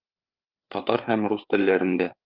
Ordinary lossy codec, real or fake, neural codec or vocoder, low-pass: Opus, 32 kbps; fake; codec, 16 kHz, 8 kbps, FreqCodec, larger model; 5.4 kHz